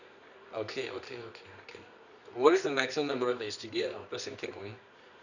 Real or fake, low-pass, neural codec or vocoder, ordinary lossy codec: fake; 7.2 kHz; codec, 24 kHz, 0.9 kbps, WavTokenizer, medium music audio release; none